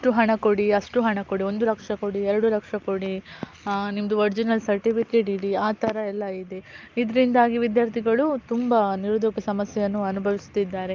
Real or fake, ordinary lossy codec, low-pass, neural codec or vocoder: real; Opus, 24 kbps; 7.2 kHz; none